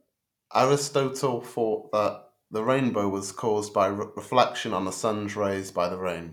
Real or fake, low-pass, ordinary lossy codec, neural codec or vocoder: real; 19.8 kHz; none; none